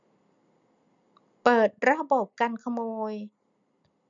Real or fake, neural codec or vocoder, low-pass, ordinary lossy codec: real; none; 7.2 kHz; none